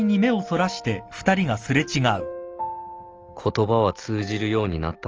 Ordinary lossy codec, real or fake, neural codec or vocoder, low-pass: Opus, 24 kbps; real; none; 7.2 kHz